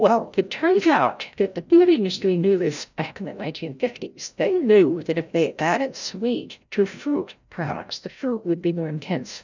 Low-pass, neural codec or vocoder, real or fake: 7.2 kHz; codec, 16 kHz, 0.5 kbps, FreqCodec, larger model; fake